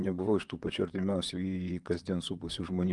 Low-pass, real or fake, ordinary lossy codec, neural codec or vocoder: 10.8 kHz; fake; Opus, 32 kbps; vocoder, 44.1 kHz, 128 mel bands, Pupu-Vocoder